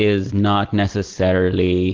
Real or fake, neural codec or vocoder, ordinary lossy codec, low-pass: real; none; Opus, 16 kbps; 7.2 kHz